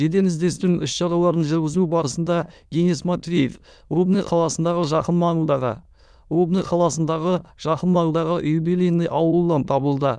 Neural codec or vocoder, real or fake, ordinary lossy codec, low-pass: autoencoder, 22.05 kHz, a latent of 192 numbers a frame, VITS, trained on many speakers; fake; none; none